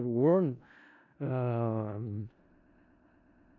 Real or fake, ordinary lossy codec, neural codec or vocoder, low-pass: fake; none; codec, 16 kHz in and 24 kHz out, 0.4 kbps, LongCat-Audio-Codec, four codebook decoder; 7.2 kHz